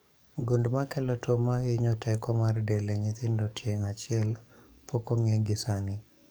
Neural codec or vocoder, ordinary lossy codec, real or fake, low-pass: codec, 44.1 kHz, 7.8 kbps, DAC; none; fake; none